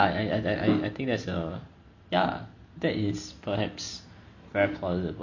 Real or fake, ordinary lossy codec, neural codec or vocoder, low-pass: real; none; none; 7.2 kHz